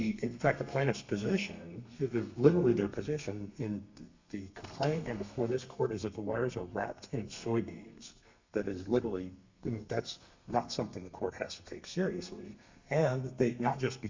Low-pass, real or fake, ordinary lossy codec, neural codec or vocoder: 7.2 kHz; fake; MP3, 64 kbps; codec, 32 kHz, 1.9 kbps, SNAC